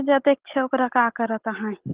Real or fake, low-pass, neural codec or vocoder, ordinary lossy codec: real; 3.6 kHz; none; Opus, 16 kbps